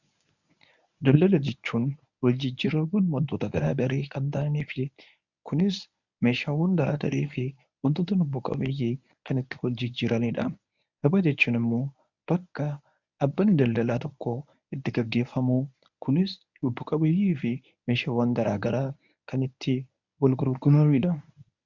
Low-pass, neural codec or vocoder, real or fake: 7.2 kHz; codec, 24 kHz, 0.9 kbps, WavTokenizer, medium speech release version 1; fake